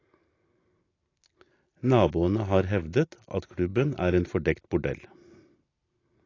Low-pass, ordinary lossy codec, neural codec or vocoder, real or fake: 7.2 kHz; AAC, 32 kbps; none; real